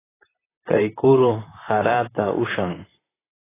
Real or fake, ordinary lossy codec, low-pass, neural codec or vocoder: fake; AAC, 16 kbps; 3.6 kHz; vocoder, 44.1 kHz, 128 mel bands, Pupu-Vocoder